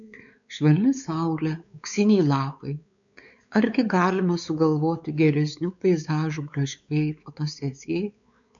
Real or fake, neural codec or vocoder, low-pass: fake; codec, 16 kHz, 4 kbps, X-Codec, WavLM features, trained on Multilingual LibriSpeech; 7.2 kHz